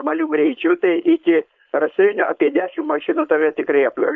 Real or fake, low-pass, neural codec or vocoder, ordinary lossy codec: fake; 7.2 kHz; codec, 16 kHz, 4.8 kbps, FACodec; MP3, 64 kbps